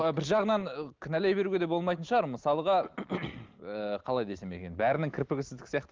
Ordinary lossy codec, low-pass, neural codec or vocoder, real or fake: Opus, 32 kbps; 7.2 kHz; none; real